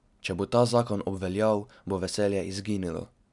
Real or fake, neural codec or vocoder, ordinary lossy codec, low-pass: real; none; none; 10.8 kHz